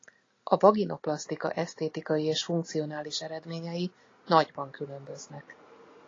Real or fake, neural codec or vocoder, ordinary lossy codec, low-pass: real; none; AAC, 32 kbps; 7.2 kHz